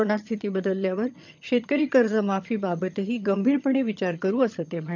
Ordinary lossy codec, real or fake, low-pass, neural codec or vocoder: none; fake; 7.2 kHz; vocoder, 22.05 kHz, 80 mel bands, HiFi-GAN